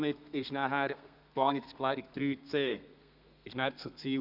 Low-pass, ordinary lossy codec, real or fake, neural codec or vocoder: 5.4 kHz; none; fake; codec, 32 kHz, 1.9 kbps, SNAC